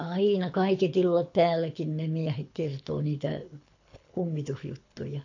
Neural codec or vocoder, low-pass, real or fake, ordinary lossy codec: codec, 24 kHz, 6 kbps, HILCodec; 7.2 kHz; fake; AAC, 48 kbps